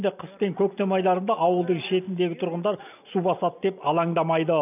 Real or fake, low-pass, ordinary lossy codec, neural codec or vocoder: real; 3.6 kHz; none; none